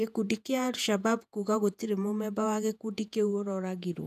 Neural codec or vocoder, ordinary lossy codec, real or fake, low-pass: vocoder, 48 kHz, 128 mel bands, Vocos; none; fake; 14.4 kHz